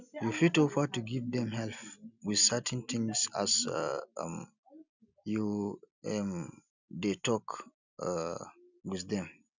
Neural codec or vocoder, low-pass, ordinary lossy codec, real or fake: none; 7.2 kHz; none; real